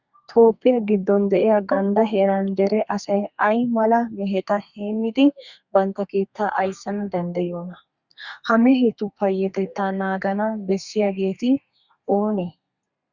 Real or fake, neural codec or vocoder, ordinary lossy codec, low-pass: fake; codec, 32 kHz, 1.9 kbps, SNAC; Opus, 64 kbps; 7.2 kHz